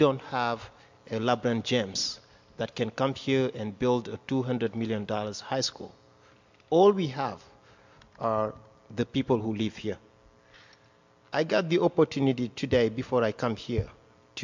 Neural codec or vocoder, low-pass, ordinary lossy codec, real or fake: none; 7.2 kHz; MP3, 64 kbps; real